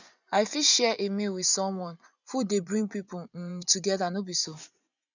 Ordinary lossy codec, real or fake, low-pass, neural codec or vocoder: none; fake; 7.2 kHz; vocoder, 24 kHz, 100 mel bands, Vocos